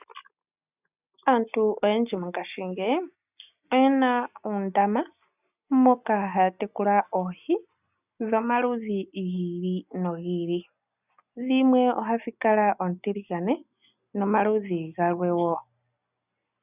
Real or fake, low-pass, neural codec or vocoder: real; 3.6 kHz; none